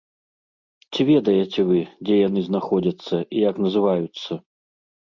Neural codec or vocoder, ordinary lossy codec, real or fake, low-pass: none; MP3, 64 kbps; real; 7.2 kHz